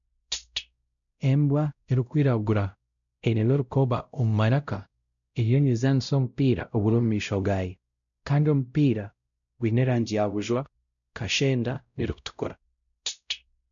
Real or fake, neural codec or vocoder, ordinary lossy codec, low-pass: fake; codec, 16 kHz, 0.5 kbps, X-Codec, WavLM features, trained on Multilingual LibriSpeech; none; 7.2 kHz